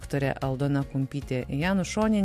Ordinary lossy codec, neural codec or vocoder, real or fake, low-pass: MP3, 64 kbps; none; real; 14.4 kHz